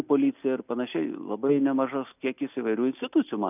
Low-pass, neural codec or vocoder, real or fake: 3.6 kHz; none; real